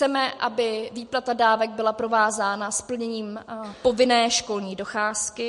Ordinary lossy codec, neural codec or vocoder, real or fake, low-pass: MP3, 48 kbps; none; real; 14.4 kHz